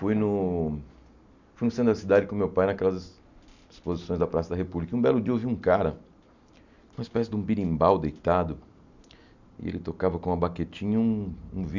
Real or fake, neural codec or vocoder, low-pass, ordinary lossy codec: real; none; 7.2 kHz; none